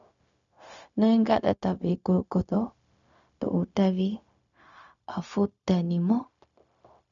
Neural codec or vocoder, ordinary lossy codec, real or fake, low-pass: codec, 16 kHz, 0.4 kbps, LongCat-Audio-Codec; MP3, 96 kbps; fake; 7.2 kHz